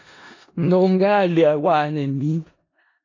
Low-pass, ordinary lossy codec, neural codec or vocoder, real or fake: 7.2 kHz; AAC, 32 kbps; codec, 16 kHz in and 24 kHz out, 0.4 kbps, LongCat-Audio-Codec, four codebook decoder; fake